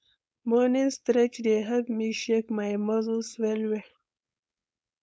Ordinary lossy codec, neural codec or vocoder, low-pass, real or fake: none; codec, 16 kHz, 4.8 kbps, FACodec; none; fake